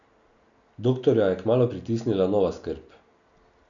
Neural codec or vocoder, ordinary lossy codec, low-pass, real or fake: none; none; 7.2 kHz; real